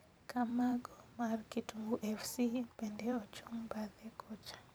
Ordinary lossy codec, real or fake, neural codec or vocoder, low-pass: none; fake; vocoder, 44.1 kHz, 128 mel bands every 512 samples, BigVGAN v2; none